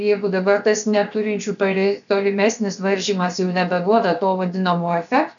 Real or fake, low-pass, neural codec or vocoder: fake; 7.2 kHz; codec, 16 kHz, 0.7 kbps, FocalCodec